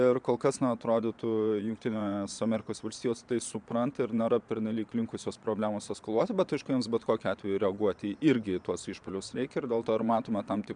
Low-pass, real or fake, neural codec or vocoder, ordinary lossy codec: 9.9 kHz; fake; vocoder, 22.05 kHz, 80 mel bands, Vocos; MP3, 96 kbps